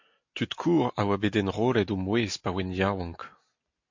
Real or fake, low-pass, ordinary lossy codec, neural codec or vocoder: real; 7.2 kHz; MP3, 48 kbps; none